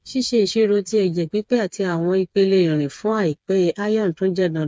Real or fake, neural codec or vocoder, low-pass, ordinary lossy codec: fake; codec, 16 kHz, 4 kbps, FreqCodec, smaller model; none; none